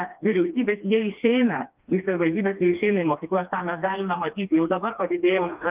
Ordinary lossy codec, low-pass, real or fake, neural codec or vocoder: Opus, 32 kbps; 3.6 kHz; fake; codec, 16 kHz, 2 kbps, FreqCodec, smaller model